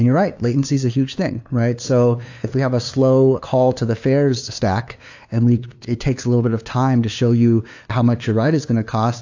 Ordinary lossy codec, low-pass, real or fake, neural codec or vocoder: AAC, 48 kbps; 7.2 kHz; fake; codec, 16 kHz, 2 kbps, FunCodec, trained on LibriTTS, 25 frames a second